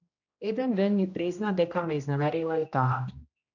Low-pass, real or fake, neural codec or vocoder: 7.2 kHz; fake; codec, 16 kHz, 1 kbps, X-Codec, HuBERT features, trained on general audio